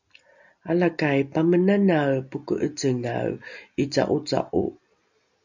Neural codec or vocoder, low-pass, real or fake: none; 7.2 kHz; real